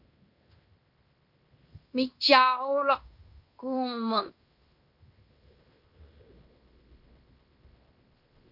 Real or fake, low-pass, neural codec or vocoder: fake; 5.4 kHz; codec, 16 kHz in and 24 kHz out, 0.9 kbps, LongCat-Audio-Codec, fine tuned four codebook decoder